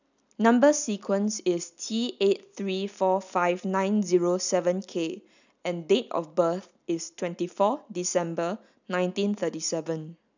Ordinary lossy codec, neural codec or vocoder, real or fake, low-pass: none; none; real; 7.2 kHz